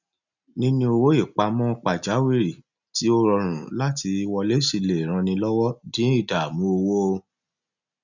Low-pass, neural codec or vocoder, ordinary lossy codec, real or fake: 7.2 kHz; none; none; real